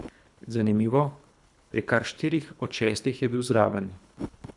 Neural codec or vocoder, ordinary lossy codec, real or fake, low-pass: codec, 24 kHz, 3 kbps, HILCodec; none; fake; 10.8 kHz